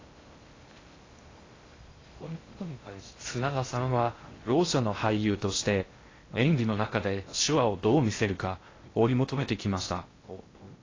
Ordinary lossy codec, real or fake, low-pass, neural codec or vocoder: AAC, 32 kbps; fake; 7.2 kHz; codec, 16 kHz in and 24 kHz out, 0.6 kbps, FocalCodec, streaming, 2048 codes